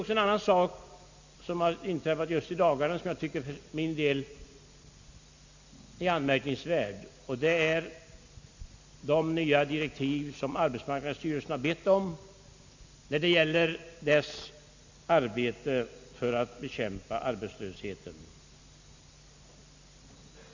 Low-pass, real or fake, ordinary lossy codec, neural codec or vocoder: 7.2 kHz; real; Opus, 64 kbps; none